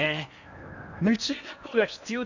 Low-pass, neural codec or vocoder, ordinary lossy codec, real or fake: 7.2 kHz; codec, 16 kHz in and 24 kHz out, 0.8 kbps, FocalCodec, streaming, 65536 codes; none; fake